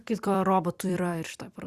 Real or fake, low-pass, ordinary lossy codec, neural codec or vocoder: fake; 14.4 kHz; Opus, 64 kbps; vocoder, 44.1 kHz, 128 mel bands every 256 samples, BigVGAN v2